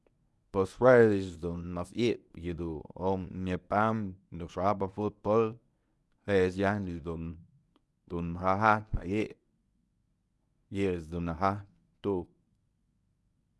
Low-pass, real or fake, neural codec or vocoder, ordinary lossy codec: none; fake; codec, 24 kHz, 0.9 kbps, WavTokenizer, medium speech release version 1; none